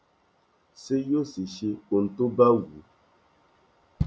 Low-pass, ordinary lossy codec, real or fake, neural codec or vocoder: none; none; real; none